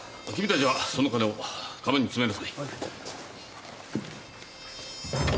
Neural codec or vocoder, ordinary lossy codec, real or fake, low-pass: none; none; real; none